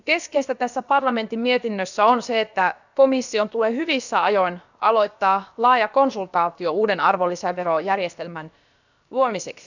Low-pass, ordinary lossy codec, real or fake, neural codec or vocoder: 7.2 kHz; none; fake; codec, 16 kHz, about 1 kbps, DyCAST, with the encoder's durations